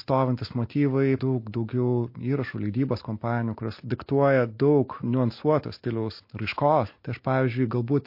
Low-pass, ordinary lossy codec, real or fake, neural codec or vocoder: 5.4 kHz; MP3, 32 kbps; real; none